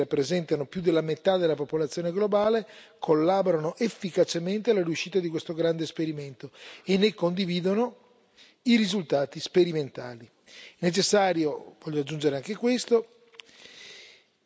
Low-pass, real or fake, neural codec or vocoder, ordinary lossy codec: none; real; none; none